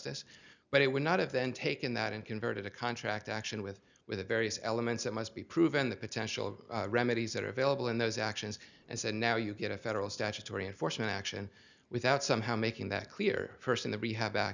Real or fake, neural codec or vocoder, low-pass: real; none; 7.2 kHz